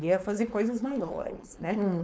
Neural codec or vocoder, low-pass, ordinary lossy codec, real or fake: codec, 16 kHz, 4.8 kbps, FACodec; none; none; fake